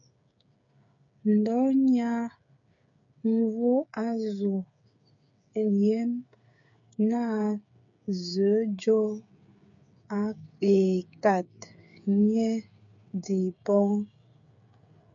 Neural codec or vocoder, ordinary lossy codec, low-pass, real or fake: codec, 16 kHz, 8 kbps, FreqCodec, smaller model; MP3, 64 kbps; 7.2 kHz; fake